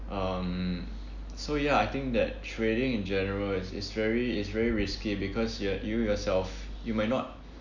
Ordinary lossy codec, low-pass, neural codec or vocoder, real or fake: none; 7.2 kHz; none; real